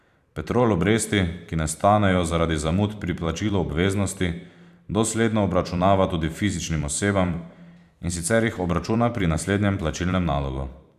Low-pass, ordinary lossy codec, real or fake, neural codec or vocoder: 14.4 kHz; none; fake; vocoder, 44.1 kHz, 128 mel bands every 512 samples, BigVGAN v2